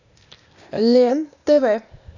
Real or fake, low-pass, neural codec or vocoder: fake; 7.2 kHz; codec, 16 kHz, 0.8 kbps, ZipCodec